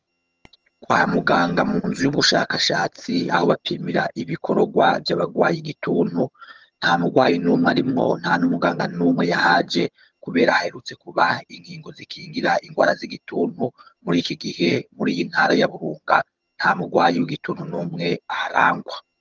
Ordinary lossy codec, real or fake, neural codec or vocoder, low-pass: Opus, 24 kbps; fake; vocoder, 22.05 kHz, 80 mel bands, HiFi-GAN; 7.2 kHz